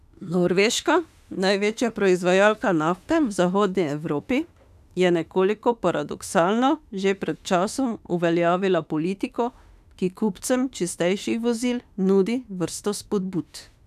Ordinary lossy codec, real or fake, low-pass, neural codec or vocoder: none; fake; 14.4 kHz; autoencoder, 48 kHz, 32 numbers a frame, DAC-VAE, trained on Japanese speech